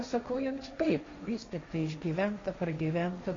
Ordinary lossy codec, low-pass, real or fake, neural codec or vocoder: AAC, 32 kbps; 7.2 kHz; fake; codec, 16 kHz, 1.1 kbps, Voila-Tokenizer